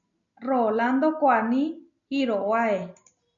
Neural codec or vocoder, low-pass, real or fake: none; 7.2 kHz; real